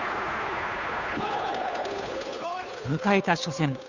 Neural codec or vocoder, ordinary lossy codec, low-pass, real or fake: codec, 16 kHz, 2 kbps, FunCodec, trained on Chinese and English, 25 frames a second; none; 7.2 kHz; fake